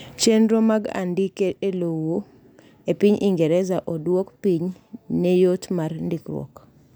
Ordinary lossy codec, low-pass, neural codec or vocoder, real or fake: none; none; none; real